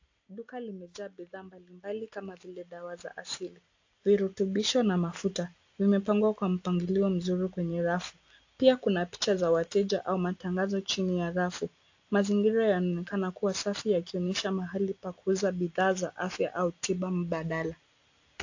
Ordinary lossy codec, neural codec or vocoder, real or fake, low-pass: AAC, 48 kbps; none; real; 7.2 kHz